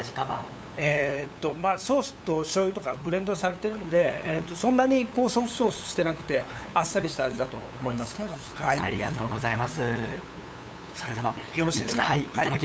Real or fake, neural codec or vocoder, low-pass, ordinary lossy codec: fake; codec, 16 kHz, 8 kbps, FunCodec, trained on LibriTTS, 25 frames a second; none; none